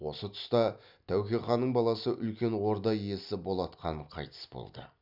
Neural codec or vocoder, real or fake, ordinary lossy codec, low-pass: none; real; none; 5.4 kHz